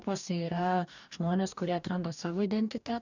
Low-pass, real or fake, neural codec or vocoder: 7.2 kHz; fake; codec, 44.1 kHz, 2.6 kbps, DAC